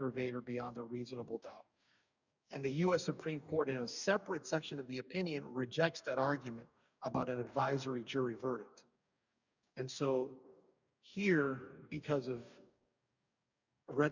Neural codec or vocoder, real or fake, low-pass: codec, 44.1 kHz, 2.6 kbps, DAC; fake; 7.2 kHz